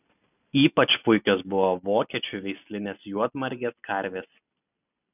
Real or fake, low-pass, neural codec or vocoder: real; 3.6 kHz; none